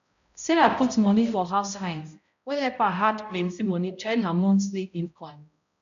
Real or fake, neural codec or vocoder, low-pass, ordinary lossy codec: fake; codec, 16 kHz, 0.5 kbps, X-Codec, HuBERT features, trained on balanced general audio; 7.2 kHz; none